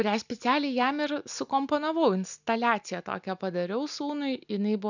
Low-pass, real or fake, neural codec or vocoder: 7.2 kHz; real; none